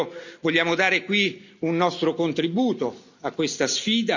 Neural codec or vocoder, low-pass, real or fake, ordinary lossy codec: none; 7.2 kHz; real; none